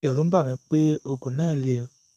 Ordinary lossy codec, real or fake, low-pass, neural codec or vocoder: none; fake; 14.4 kHz; codec, 32 kHz, 1.9 kbps, SNAC